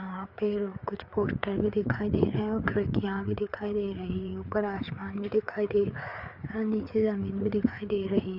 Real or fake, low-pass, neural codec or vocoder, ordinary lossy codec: fake; 5.4 kHz; codec, 16 kHz, 4 kbps, FreqCodec, larger model; none